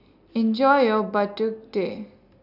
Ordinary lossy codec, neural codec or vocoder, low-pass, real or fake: none; none; 5.4 kHz; real